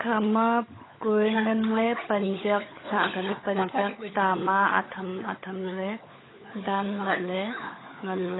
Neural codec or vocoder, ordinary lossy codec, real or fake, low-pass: codec, 16 kHz, 16 kbps, FunCodec, trained on LibriTTS, 50 frames a second; AAC, 16 kbps; fake; 7.2 kHz